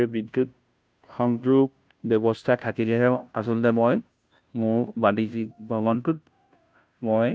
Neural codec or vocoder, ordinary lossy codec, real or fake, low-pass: codec, 16 kHz, 0.5 kbps, FunCodec, trained on Chinese and English, 25 frames a second; none; fake; none